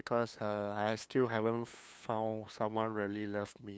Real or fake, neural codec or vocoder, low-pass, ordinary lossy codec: fake; codec, 16 kHz, 2 kbps, FunCodec, trained on LibriTTS, 25 frames a second; none; none